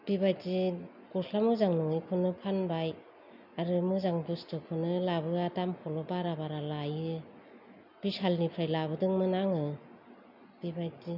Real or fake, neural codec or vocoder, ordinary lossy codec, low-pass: real; none; none; 5.4 kHz